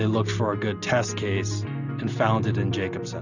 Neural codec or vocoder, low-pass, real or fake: none; 7.2 kHz; real